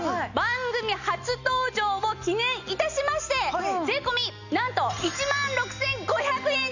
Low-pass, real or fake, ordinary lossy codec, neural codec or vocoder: 7.2 kHz; real; none; none